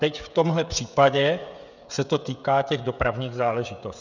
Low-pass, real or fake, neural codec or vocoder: 7.2 kHz; fake; codec, 16 kHz, 16 kbps, FreqCodec, smaller model